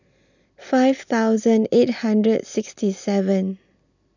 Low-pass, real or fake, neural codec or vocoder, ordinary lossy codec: 7.2 kHz; real; none; none